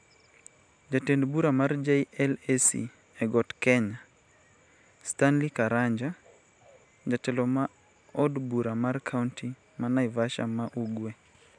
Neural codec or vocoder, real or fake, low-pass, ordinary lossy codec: none; real; 9.9 kHz; none